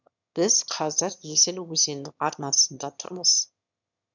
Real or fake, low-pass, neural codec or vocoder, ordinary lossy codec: fake; 7.2 kHz; autoencoder, 22.05 kHz, a latent of 192 numbers a frame, VITS, trained on one speaker; none